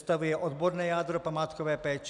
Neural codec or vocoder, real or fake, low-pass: none; real; 10.8 kHz